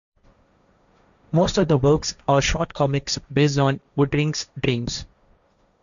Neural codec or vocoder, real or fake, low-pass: codec, 16 kHz, 1.1 kbps, Voila-Tokenizer; fake; 7.2 kHz